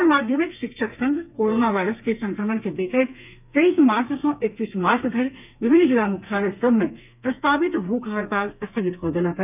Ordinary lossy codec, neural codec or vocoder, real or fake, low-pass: none; codec, 32 kHz, 1.9 kbps, SNAC; fake; 3.6 kHz